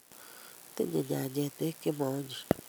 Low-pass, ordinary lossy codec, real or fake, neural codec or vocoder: none; none; real; none